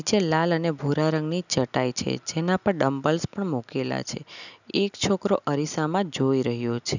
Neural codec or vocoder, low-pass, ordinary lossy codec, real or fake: none; 7.2 kHz; none; real